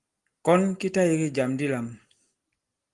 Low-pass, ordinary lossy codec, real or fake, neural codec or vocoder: 10.8 kHz; Opus, 24 kbps; real; none